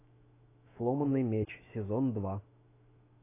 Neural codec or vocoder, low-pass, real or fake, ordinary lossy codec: autoencoder, 48 kHz, 128 numbers a frame, DAC-VAE, trained on Japanese speech; 3.6 kHz; fake; AAC, 24 kbps